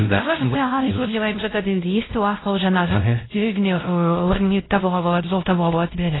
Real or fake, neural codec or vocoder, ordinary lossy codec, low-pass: fake; codec, 16 kHz, 0.5 kbps, X-Codec, WavLM features, trained on Multilingual LibriSpeech; AAC, 16 kbps; 7.2 kHz